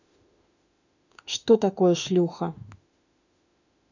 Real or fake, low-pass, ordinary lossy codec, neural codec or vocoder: fake; 7.2 kHz; none; autoencoder, 48 kHz, 32 numbers a frame, DAC-VAE, trained on Japanese speech